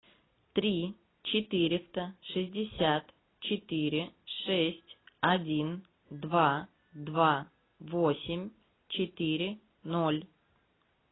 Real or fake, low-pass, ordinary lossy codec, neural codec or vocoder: real; 7.2 kHz; AAC, 16 kbps; none